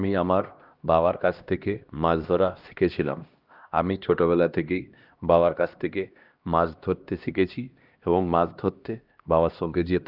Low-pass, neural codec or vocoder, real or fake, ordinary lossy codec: 5.4 kHz; codec, 16 kHz, 1 kbps, X-Codec, HuBERT features, trained on LibriSpeech; fake; Opus, 32 kbps